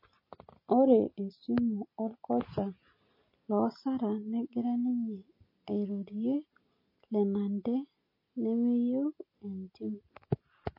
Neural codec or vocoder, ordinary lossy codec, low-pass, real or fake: none; MP3, 24 kbps; 5.4 kHz; real